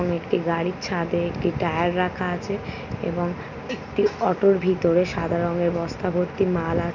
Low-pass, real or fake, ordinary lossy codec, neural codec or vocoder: 7.2 kHz; real; none; none